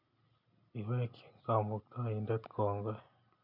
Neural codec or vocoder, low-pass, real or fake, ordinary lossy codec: none; 5.4 kHz; real; none